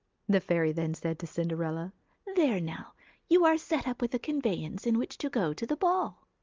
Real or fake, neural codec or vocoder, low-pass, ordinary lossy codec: real; none; 7.2 kHz; Opus, 32 kbps